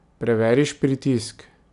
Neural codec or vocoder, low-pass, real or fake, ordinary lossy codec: none; 10.8 kHz; real; none